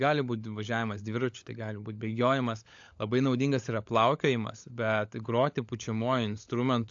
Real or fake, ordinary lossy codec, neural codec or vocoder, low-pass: fake; AAC, 64 kbps; codec, 16 kHz, 16 kbps, FunCodec, trained on LibriTTS, 50 frames a second; 7.2 kHz